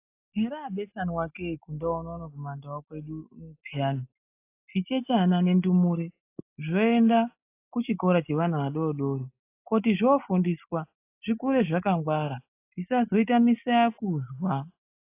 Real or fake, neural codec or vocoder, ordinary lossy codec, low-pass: real; none; AAC, 24 kbps; 3.6 kHz